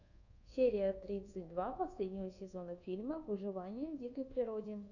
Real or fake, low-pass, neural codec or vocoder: fake; 7.2 kHz; codec, 24 kHz, 1.2 kbps, DualCodec